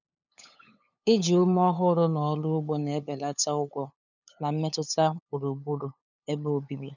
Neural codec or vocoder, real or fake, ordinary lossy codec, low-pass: codec, 16 kHz, 8 kbps, FunCodec, trained on LibriTTS, 25 frames a second; fake; none; 7.2 kHz